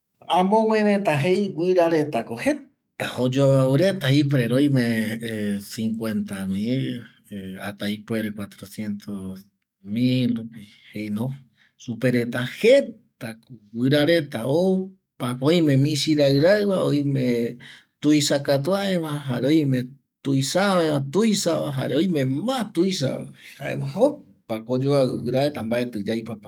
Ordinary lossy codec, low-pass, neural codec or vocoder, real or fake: none; 19.8 kHz; codec, 44.1 kHz, 7.8 kbps, DAC; fake